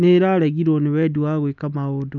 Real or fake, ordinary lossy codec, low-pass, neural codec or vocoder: real; none; 7.2 kHz; none